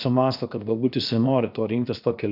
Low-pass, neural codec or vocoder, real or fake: 5.4 kHz; codec, 16 kHz, 0.7 kbps, FocalCodec; fake